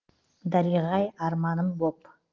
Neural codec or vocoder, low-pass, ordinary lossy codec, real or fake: none; 7.2 kHz; Opus, 16 kbps; real